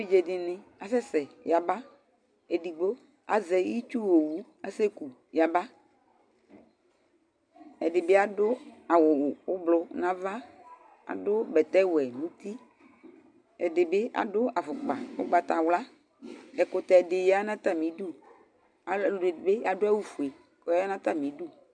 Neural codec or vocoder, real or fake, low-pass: none; real; 9.9 kHz